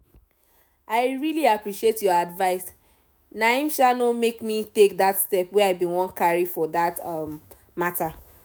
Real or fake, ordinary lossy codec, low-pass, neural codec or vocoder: fake; none; none; autoencoder, 48 kHz, 128 numbers a frame, DAC-VAE, trained on Japanese speech